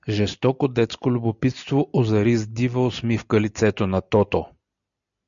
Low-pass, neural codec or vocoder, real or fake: 7.2 kHz; none; real